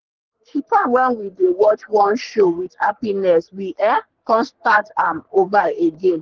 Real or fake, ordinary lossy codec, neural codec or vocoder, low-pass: fake; Opus, 16 kbps; codec, 44.1 kHz, 3.4 kbps, Pupu-Codec; 7.2 kHz